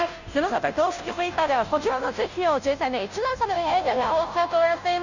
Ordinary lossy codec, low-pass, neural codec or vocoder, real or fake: none; 7.2 kHz; codec, 16 kHz, 0.5 kbps, FunCodec, trained on Chinese and English, 25 frames a second; fake